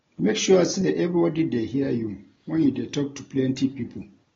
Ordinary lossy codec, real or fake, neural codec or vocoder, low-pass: AAC, 24 kbps; real; none; 7.2 kHz